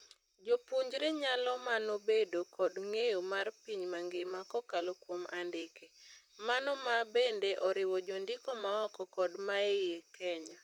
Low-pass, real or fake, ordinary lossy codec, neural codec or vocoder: 19.8 kHz; fake; none; vocoder, 44.1 kHz, 128 mel bands, Pupu-Vocoder